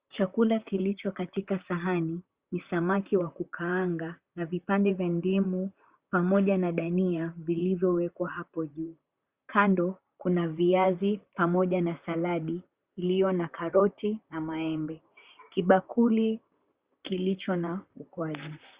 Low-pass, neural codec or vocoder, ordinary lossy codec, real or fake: 3.6 kHz; vocoder, 44.1 kHz, 128 mel bands, Pupu-Vocoder; Opus, 64 kbps; fake